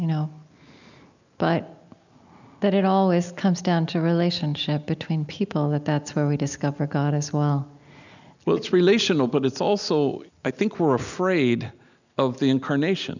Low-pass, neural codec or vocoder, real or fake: 7.2 kHz; none; real